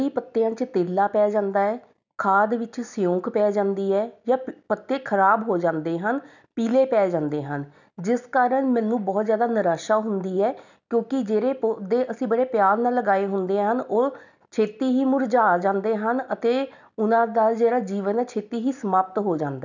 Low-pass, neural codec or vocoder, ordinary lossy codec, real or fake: 7.2 kHz; none; none; real